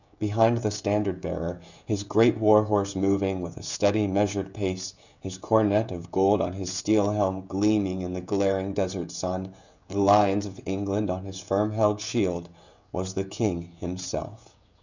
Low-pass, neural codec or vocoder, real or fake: 7.2 kHz; codec, 16 kHz, 16 kbps, FreqCodec, smaller model; fake